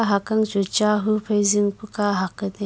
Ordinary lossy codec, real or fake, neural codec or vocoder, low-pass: none; real; none; none